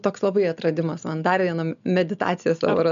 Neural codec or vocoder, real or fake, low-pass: none; real; 7.2 kHz